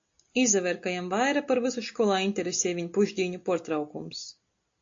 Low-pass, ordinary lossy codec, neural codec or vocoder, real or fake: 7.2 kHz; AAC, 48 kbps; none; real